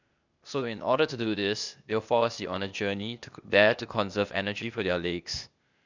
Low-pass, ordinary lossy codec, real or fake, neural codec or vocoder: 7.2 kHz; none; fake; codec, 16 kHz, 0.8 kbps, ZipCodec